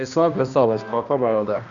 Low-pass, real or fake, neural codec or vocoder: 7.2 kHz; fake; codec, 16 kHz, 1 kbps, X-Codec, HuBERT features, trained on balanced general audio